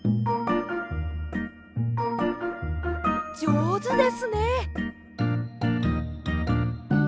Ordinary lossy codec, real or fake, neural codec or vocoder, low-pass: none; real; none; none